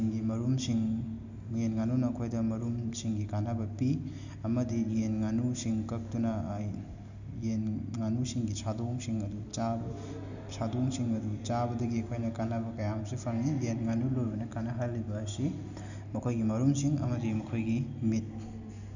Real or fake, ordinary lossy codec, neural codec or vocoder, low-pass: real; none; none; 7.2 kHz